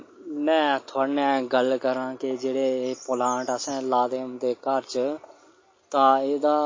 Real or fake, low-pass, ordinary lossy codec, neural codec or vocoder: real; 7.2 kHz; MP3, 32 kbps; none